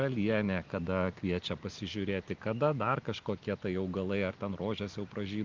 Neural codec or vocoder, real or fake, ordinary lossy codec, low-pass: none; real; Opus, 32 kbps; 7.2 kHz